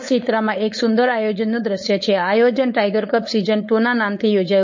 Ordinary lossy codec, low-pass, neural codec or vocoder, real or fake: MP3, 32 kbps; 7.2 kHz; codec, 16 kHz, 4.8 kbps, FACodec; fake